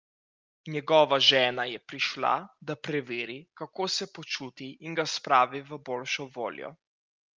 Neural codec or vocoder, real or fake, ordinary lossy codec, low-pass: none; real; Opus, 32 kbps; 7.2 kHz